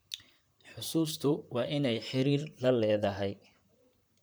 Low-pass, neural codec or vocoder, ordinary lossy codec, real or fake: none; vocoder, 44.1 kHz, 128 mel bands, Pupu-Vocoder; none; fake